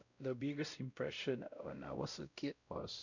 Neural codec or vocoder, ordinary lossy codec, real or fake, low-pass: codec, 16 kHz, 0.5 kbps, X-Codec, WavLM features, trained on Multilingual LibriSpeech; none; fake; 7.2 kHz